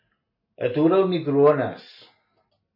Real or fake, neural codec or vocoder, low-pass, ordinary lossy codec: real; none; 5.4 kHz; MP3, 24 kbps